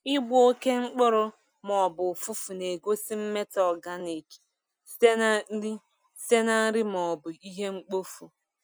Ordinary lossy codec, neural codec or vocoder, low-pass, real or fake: none; none; none; real